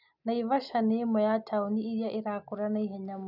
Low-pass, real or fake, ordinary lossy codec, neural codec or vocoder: 5.4 kHz; real; none; none